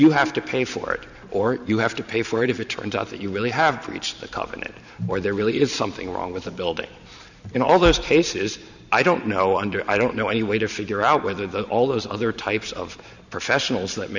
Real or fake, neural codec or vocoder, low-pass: fake; vocoder, 44.1 kHz, 128 mel bands every 512 samples, BigVGAN v2; 7.2 kHz